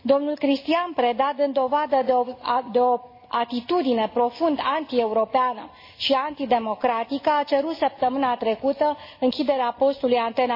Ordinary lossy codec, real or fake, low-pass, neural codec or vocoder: MP3, 24 kbps; real; 5.4 kHz; none